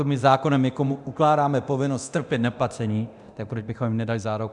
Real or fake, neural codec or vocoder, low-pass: fake; codec, 24 kHz, 0.9 kbps, DualCodec; 10.8 kHz